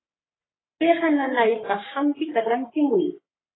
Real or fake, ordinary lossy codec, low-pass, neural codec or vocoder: fake; AAC, 16 kbps; 7.2 kHz; codec, 44.1 kHz, 3.4 kbps, Pupu-Codec